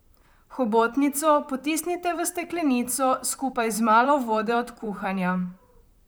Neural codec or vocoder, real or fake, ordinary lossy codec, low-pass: vocoder, 44.1 kHz, 128 mel bands, Pupu-Vocoder; fake; none; none